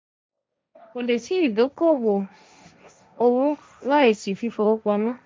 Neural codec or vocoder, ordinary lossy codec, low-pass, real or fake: codec, 16 kHz, 1.1 kbps, Voila-Tokenizer; none; none; fake